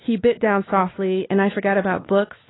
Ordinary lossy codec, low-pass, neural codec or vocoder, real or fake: AAC, 16 kbps; 7.2 kHz; codec, 16 kHz, 2 kbps, X-Codec, WavLM features, trained on Multilingual LibriSpeech; fake